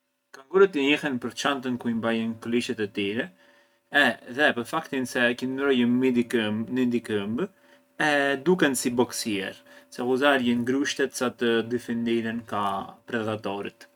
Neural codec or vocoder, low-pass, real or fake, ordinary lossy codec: none; 19.8 kHz; real; none